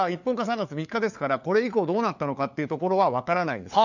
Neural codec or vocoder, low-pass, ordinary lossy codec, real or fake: codec, 16 kHz, 4 kbps, FunCodec, trained on LibriTTS, 50 frames a second; 7.2 kHz; none; fake